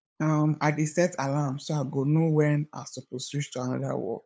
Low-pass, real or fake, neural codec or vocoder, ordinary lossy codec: none; fake; codec, 16 kHz, 8 kbps, FunCodec, trained on LibriTTS, 25 frames a second; none